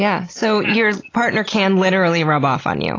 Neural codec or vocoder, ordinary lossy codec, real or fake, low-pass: none; AAC, 32 kbps; real; 7.2 kHz